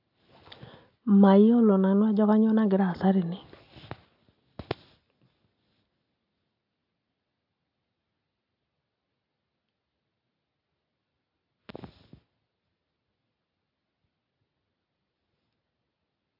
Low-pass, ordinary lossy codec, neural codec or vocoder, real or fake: 5.4 kHz; AAC, 48 kbps; none; real